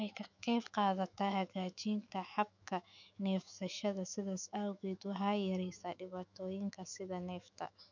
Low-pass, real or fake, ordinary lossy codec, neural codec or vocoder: 7.2 kHz; fake; none; autoencoder, 48 kHz, 128 numbers a frame, DAC-VAE, trained on Japanese speech